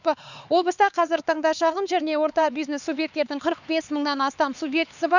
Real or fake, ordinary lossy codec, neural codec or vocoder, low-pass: fake; none; codec, 16 kHz, 4 kbps, X-Codec, HuBERT features, trained on LibriSpeech; 7.2 kHz